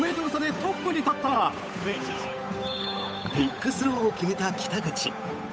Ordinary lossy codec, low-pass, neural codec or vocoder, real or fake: none; none; codec, 16 kHz, 8 kbps, FunCodec, trained on Chinese and English, 25 frames a second; fake